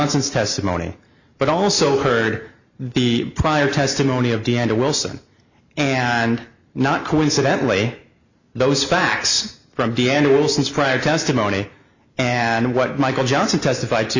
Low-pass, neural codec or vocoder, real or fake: 7.2 kHz; none; real